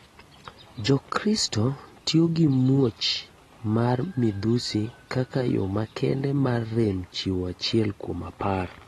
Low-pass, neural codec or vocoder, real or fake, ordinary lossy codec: 19.8 kHz; none; real; AAC, 32 kbps